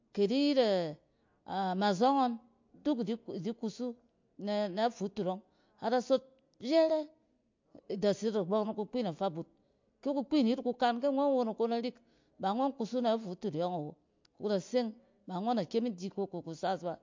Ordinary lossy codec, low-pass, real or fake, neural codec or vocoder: MP3, 48 kbps; 7.2 kHz; real; none